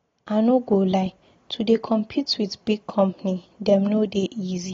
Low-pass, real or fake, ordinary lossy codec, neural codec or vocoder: 7.2 kHz; real; AAC, 32 kbps; none